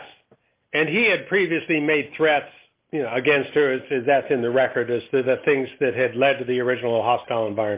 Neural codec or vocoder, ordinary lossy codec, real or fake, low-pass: none; Opus, 32 kbps; real; 3.6 kHz